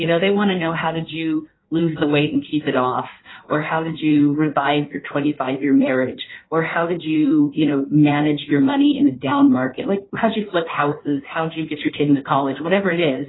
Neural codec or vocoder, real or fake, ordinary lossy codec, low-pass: codec, 16 kHz in and 24 kHz out, 1.1 kbps, FireRedTTS-2 codec; fake; AAC, 16 kbps; 7.2 kHz